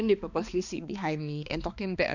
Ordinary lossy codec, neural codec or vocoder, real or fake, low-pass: none; codec, 16 kHz, 2 kbps, X-Codec, HuBERT features, trained on balanced general audio; fake; 7.2 kHz